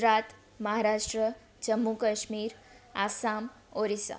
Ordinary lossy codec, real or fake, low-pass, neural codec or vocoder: none; real; none; none